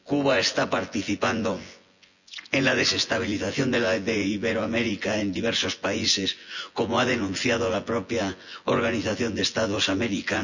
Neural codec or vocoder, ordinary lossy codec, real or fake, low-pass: vocoder, 24 kHz, 100 mel bands, Vocos; none; fake; 7.2 kHz